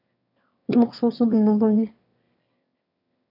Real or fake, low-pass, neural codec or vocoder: fake; 5.4 kHz; autoencoder, 22.05 kHz, a latent of 192 numbers a frame, VITS, trained on one speaker